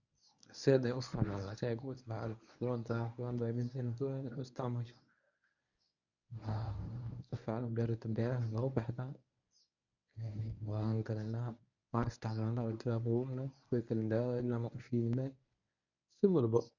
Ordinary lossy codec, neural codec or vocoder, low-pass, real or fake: none; codec, 24 kHz, 0.9 kbps, WavTokenizer, medium speech release version 1; 7.2 kHz; fake